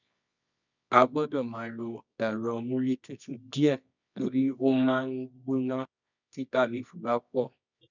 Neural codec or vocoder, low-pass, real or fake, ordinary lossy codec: codec, 24 kHz, 0.9 kbps, WavTokenizer, medium music audio release; 7.2 kHz; fake; none